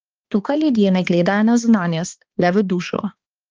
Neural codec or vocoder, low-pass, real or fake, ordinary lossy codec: codec, 16 kHz, 2 kbps, X-Codec, HuBERT features, trained on balanced general audio; 7.2 kHz; fake; Opus, 24 kbps